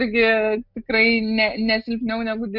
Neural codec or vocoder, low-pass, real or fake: none; 5.4 kHz; real